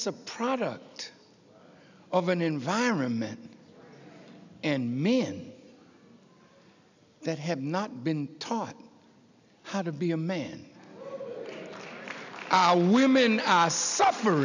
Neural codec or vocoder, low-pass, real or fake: none; 7.2 kHz; real